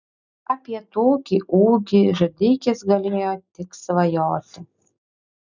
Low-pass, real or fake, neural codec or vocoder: 7.2 kHz; real; none